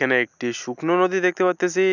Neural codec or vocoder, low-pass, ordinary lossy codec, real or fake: none; 7.2 kHz; none; real